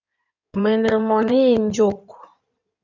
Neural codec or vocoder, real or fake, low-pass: codec, 16 kHz in and 24 kHz out, 2.2 kbps, FireRedTTS-2 codec; fake; 7.2 kHz